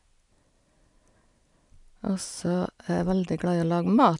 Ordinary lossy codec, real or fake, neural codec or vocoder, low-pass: none; real; none; 10.8 kHz